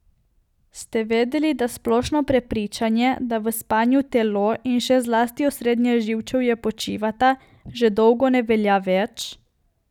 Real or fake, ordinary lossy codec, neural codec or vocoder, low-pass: real; none; none; 19.8 kHz